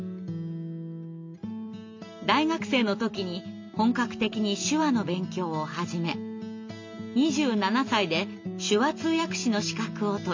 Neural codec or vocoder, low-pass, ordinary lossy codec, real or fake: none; 7.2 kHz; MP3, 48 kbps; real